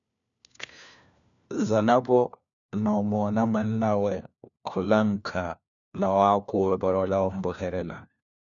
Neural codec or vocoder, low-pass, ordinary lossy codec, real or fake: codec, 16 kHz, 1 kbps, FunCodec, trained on LibriTTS, 50 frames a second; 7.2 kHz; MP3, 96 kbps; fake